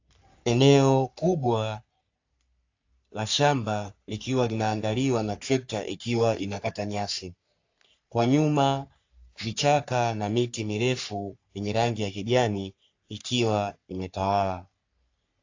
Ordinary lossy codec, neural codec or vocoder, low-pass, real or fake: AAC, 48 kbps; codec, 44.1 kHz, 3.4 kbps, Pupu-Codec; 7.2 kHz; fake